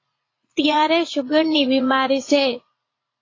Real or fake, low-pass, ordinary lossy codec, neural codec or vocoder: fake; 7.2 kHz; AAC, 32 kbps; vocoder, 44.1 kHz, 80 mel bands, Vocos